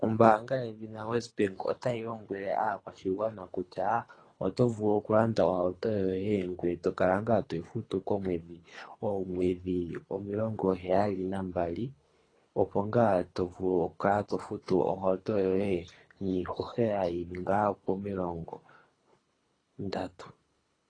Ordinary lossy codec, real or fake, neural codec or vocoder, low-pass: AAC, 32 kbps; fake; codec, 24 kHz, 3 kbps, HILCodec; 9.9 kHz